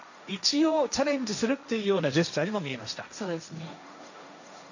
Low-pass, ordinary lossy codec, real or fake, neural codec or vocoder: 7.2 kHz; none; fake; codec, 16 kHz, 1.1 kbps, Voila-Tokenizer